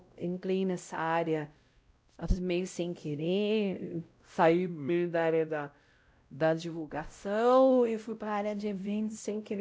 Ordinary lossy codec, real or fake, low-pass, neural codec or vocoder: none; fake; none; codec, 16 kHz, 0.5 kbps, X-Codec, WavLM features, trained on Multilingual LibriSpeech